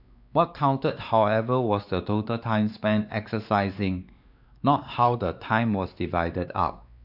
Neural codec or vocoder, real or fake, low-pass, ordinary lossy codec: codec, 16 kHz, 2 kbps, X-Codec, WavLM features, trained on Multilingual LibriSpeech; fake; 5.4 kHz; none